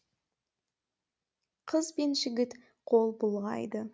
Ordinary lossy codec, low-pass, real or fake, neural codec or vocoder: none; none; real; none